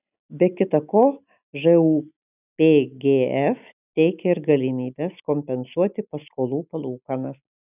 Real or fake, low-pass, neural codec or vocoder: real; 3.6 kHz; none